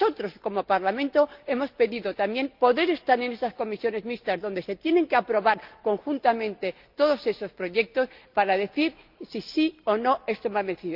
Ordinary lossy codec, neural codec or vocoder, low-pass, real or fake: Opus, 24 kbps; none; 5.4 kHz; real